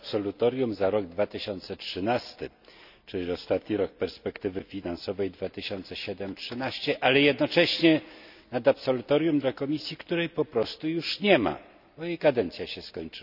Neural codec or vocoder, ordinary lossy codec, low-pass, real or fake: none; none; 5.4 kHz; real